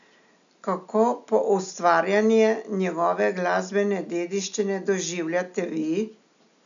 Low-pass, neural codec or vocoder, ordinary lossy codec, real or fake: 7.2 kHz; none; none; real